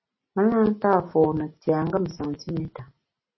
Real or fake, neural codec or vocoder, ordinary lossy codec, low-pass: real; none; MP3, 24 kbps; 7.2 kHz